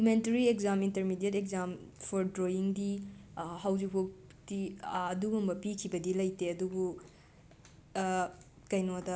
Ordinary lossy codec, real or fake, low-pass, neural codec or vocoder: none; real; none; none